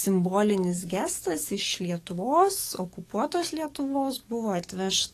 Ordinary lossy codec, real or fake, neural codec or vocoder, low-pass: AAC, 48 kbps; fake; codec, 44.1 kHz, 7.8 kbps, DAC; 14.4 kHz